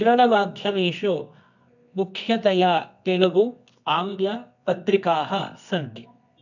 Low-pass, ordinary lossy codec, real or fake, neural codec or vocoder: 7.2 kHz; none; fake; codec, 24 kHz, 0.9 kbps, WavTokenizer, medium music audio release